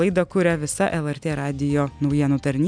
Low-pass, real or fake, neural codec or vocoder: 9.9 kHz; real; none